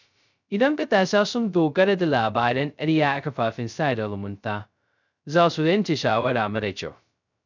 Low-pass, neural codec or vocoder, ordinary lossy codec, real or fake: 7.2 kHz; codec, 16 kHz, 0.2 kbps, FocalCodec; none; fake